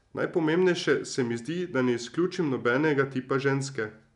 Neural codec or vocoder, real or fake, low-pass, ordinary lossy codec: none; real; 10.8 kHz; none